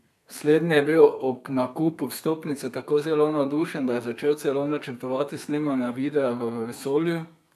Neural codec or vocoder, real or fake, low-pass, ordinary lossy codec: codec, 32 kHz, 1.9 kbps, SNAC; fake; 14.4 kHz; none